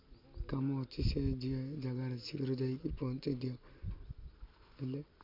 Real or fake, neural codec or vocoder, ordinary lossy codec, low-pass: real; none; MP3, 48 kbps; 5.4 kHz